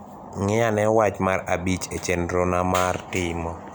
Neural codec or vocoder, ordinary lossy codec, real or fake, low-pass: none; none; real; none